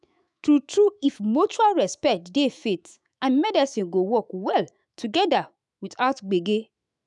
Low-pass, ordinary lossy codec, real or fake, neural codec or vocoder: 10.8 kHz; none; fake; autoencoder, 48 kHz, 128 numbers a frame, DAC-VAE, trained on Japanese speech